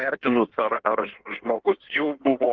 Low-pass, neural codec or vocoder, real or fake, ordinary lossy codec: 7.2 kHz; codec, 16 kHz in and 24 kHz out, 1.1 kbps, FireRedTTS-2 codec; fake; Opus, 16 kbps